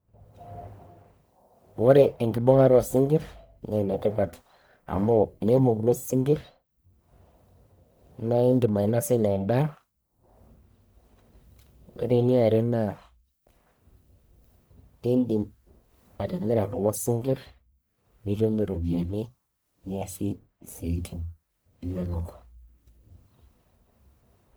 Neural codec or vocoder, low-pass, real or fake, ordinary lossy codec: codec, 44.1 kHz, 1.7 kbps, Pupu-Codec; none; fake; none